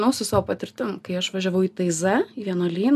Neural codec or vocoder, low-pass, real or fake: none; 14.4 kHz; real